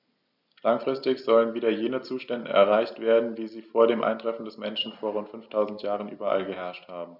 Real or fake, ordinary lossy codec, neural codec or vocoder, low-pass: real; none; none; 5.4 kHz